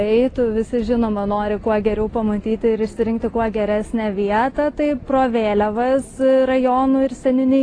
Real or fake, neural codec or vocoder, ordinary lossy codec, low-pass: real; none; AAC, 32 kbps; 9.9 kHz